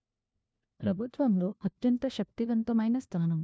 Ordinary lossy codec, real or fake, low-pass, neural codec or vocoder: none; fake; none; codec, 16 kHz, 1 kbps, FunCodec, trained on LibriTTS, 50 frames a second